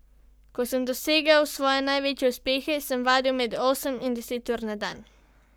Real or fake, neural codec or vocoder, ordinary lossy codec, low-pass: fake; codec, 44.1 kHz, 7.8 kbps, Pupu-Codec; none; none